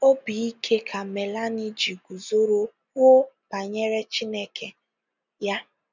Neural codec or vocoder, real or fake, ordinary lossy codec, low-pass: none; real; none; 7.2 kHz